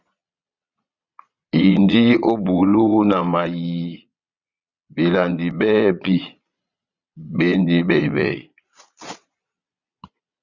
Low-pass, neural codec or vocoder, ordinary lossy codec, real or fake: 7.2 kHz; vocoder, 22.05 kHz, 80 mel bands, Vocos; Opus, 64 kbps; fake